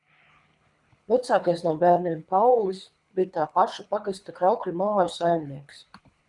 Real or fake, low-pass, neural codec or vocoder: fake; 10.8 kHz; codec, 24 kHz, 3 kbps, HILCodec